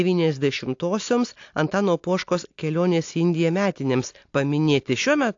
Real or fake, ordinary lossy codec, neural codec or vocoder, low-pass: real; AAC, 48 kbps; none; 7.2 kHz